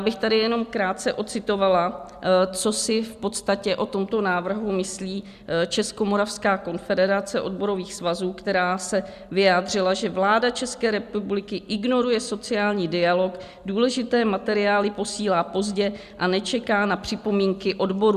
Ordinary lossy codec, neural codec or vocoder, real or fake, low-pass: Opus, 64 kbps; none; real; 14.4 kHz